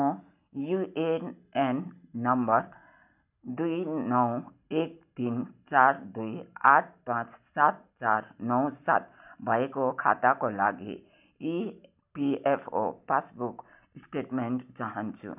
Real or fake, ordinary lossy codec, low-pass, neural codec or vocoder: real; none; 3.6 kHz; none